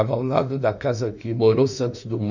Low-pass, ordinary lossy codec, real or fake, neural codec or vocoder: 7.2 kHz; none; fake; autoencoder, 48 kHz, 32 numbers a frame, DAC-VAE, trained on Japanese speech